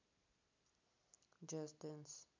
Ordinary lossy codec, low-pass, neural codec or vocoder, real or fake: MP3, 64 kbps; 7.2 kHz; none; real